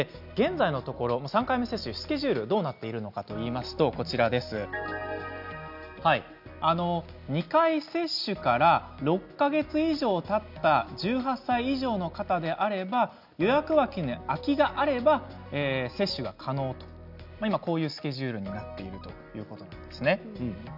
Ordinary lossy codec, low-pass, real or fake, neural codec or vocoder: none; 5.4 kHz; real; none